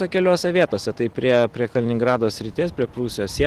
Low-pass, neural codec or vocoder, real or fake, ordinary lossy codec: 14.4 kHz; none; real; Opus, 16 kbps